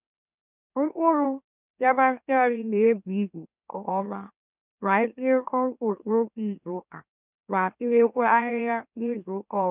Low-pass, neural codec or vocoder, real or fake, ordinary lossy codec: 3.6 kHz; autoencoder, 44.1 kHz, a latent of 192 numbers a frame, MeloTTS; fake; none